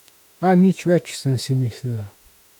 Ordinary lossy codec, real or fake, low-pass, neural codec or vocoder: none; fake; 19.8 kHz; autoencoder, 48 kHz, 32 numbers a frame, DAC-VAE, trained on Japanese speech